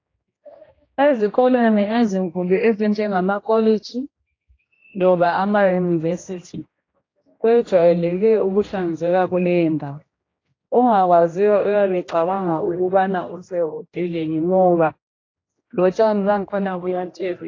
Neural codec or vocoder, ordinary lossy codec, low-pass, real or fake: codec, 16 kHz, 1 kbps, X-Codec, HuBERT features, trained on general audio; AAC, 32 kbps; 7.2 kHz; fake